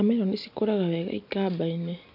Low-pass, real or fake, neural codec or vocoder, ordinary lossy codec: 5.4 kHz; real; none; AAC, 48 kbps